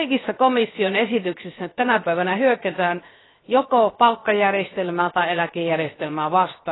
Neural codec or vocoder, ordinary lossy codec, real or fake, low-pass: codec, 16 kHz, 0.7 kbps, FocalCodec; AAC, 16 kbps; fake; 7.2 kHz